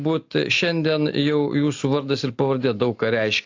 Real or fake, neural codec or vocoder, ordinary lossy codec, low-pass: real; none; AAC, 48 kbps; 7.2 kHz